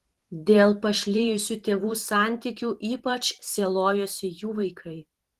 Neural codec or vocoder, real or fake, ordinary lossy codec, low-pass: vocoder, 44.1 kHz, 128 mel bands every 512 samples, BigVGAN v2; fake; Opus, 16 kbps; 14.4 kHz